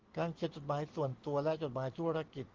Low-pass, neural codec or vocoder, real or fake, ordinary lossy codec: 7.2 kHz; none; real; Opus, 16 kbps